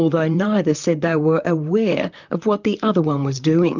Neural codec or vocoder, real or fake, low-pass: vocoder, 44.1 kHz, 128 mel bands, Pupu-Vocoder; fake; 7.2 kHz